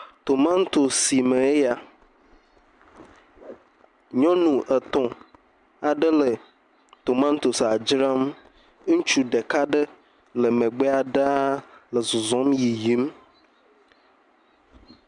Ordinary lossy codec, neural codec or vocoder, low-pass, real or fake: MP3, 96 kbps; none; 10.8 kHz; real